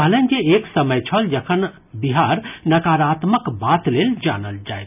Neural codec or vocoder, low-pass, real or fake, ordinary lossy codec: none; 3.6 kHz; real; none